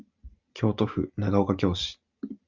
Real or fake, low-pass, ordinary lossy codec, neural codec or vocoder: real; 7.2 kHz; Opus, 64 kbps; none